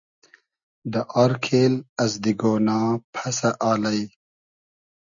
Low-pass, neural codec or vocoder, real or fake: 7.2 kHz; none; real